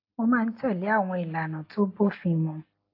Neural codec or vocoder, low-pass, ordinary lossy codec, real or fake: none; 5.4 kHz; AAC, 32 kbps; real